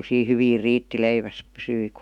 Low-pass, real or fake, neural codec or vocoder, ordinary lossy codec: 19.8 kHz; fake; autoencoder, 48 kHz, 128 numbers a frame, DAC-VAE, trained on Japanese speech; none